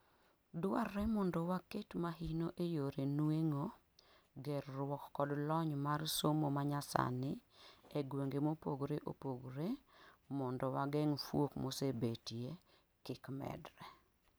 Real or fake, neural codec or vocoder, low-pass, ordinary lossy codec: real; none; none; none